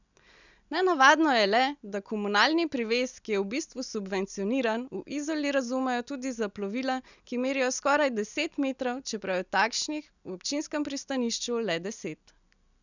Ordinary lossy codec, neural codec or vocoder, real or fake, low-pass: none; none; real; 7.2 kHz